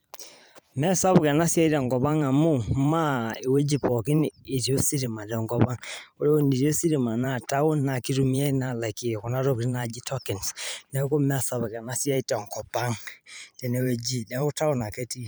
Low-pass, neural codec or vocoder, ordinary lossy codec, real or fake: none; vocoder, 44.1 kHz, 128 mel bands every 512 samples, BigVGAN v2; none; fake